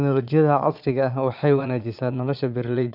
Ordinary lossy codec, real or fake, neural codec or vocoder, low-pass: none; fake; vocoder, 22.05 kHz, 80 mel bands, Vocos; 5.4 kHz